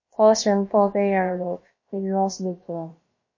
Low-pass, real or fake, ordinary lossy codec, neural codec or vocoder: 7.2 kHz; fake; MP3, 32 kbps; codec, 16 kHz, about 1 kbps, DyCAST, with the encoder's durations